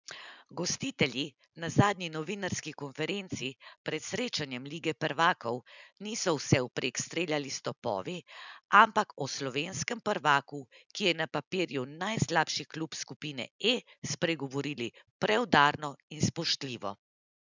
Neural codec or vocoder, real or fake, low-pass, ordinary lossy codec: none; real; 7.2 kHz; none